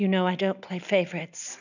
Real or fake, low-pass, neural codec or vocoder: real; 7.2 kHz; none